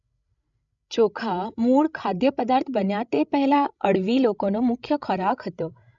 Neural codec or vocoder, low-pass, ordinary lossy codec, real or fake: codec, 16 kHz, 16 kbps, FreqCodec, larger model; 7.2 kHz; none; fake